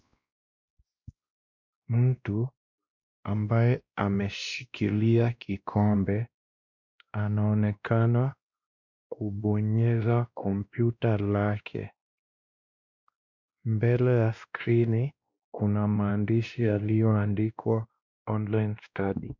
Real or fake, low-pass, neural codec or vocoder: fake; 7.2 kHz; codec, 16 kHz, 1 kbps, X-Codec, WavLM features, trained on Multilingual LibriSpeech